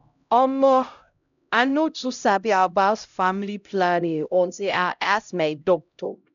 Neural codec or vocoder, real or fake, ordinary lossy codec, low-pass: codec, 16 kHz, 0.5 kbps, X-Codec, HuBERT features, trained on LibriSpeech; fake; none; 7.2 kHz